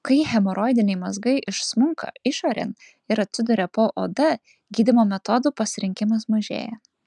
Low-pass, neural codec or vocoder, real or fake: 10.8 kHz; none; real